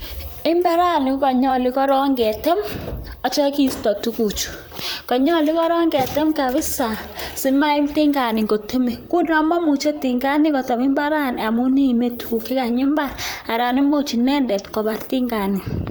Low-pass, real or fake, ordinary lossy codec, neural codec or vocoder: none; fake; none; vocoder, 44.1 kHz, 128 mel bands, Pupu-Vocoder